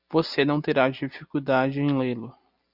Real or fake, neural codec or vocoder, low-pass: real; none; 5.4 kHz